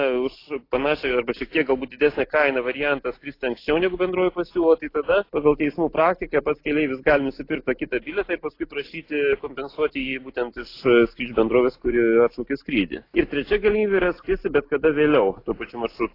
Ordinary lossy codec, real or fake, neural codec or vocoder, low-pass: AAC, 32 kbps; real; none; 5.4 kHz